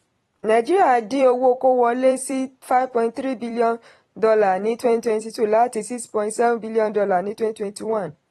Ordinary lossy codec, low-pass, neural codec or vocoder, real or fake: AAC, 32 kbps; 19.8 kHz; vocoder, 44.1 kHz, 128 mel bands every 256 samples, BigVGAN v2; fake